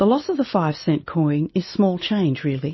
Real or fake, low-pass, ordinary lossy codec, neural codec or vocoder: real; 7.2 kHz; MP3, 24 kbps; none